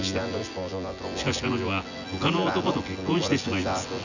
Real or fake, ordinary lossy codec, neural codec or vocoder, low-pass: fake; none; vocoder, 24 kHz, 100 mel bands, Vocos; 7.2 kHz